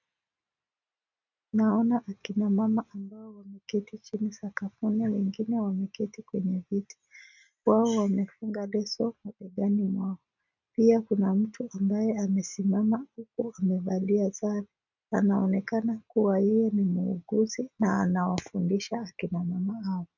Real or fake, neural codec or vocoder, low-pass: real; none; 7.2 kHz